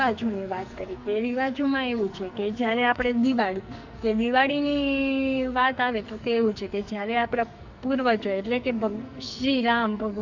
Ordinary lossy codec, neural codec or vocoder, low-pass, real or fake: Opus, 64 kbps; codec, 44.1 kHz, 2.6 kbps, SNAC; 7.2 kHz; fake